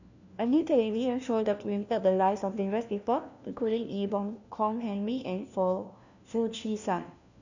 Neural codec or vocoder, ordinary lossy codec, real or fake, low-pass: codec, 16 kHz, 1 kbps, FunCodec, trained on LibriTTS, 50 frames a second; none; fake; 7.2 kHz